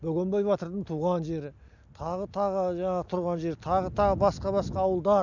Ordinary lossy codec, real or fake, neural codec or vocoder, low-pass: none; real; none; 7.2 kHz